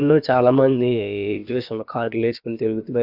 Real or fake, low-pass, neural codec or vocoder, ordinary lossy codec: fake; 5.4 kHz; codec, 16 kHz, about 1 kbps, DyCAST, with the encoder's durations; none